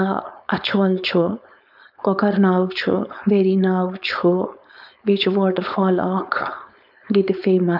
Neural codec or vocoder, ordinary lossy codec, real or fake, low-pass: codec, 16 kHz, 4.8 kbps, FACodec; none; fake; 5.4 kHz